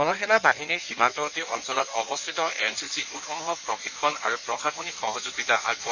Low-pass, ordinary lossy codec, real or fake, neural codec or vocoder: 7.2 kHz; none; fake; codec, 16 kHz in and 24 kHz out, 1.1 kbps, FireRedTTS-2 codec